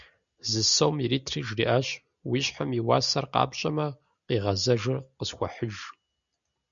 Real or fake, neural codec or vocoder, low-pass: real; none; 7.2 kHz